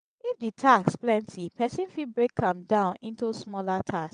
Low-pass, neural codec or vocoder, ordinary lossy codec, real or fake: 9.9 kHz; vocoder, 22.05 kHz, 80 mel bands, WaveNeXt; none; fake